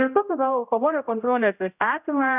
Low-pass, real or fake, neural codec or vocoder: 3.6 kHz; fake; codec, 16 kHz, 0.5 kbps, X-Codec, HuBERT features, trained on general audio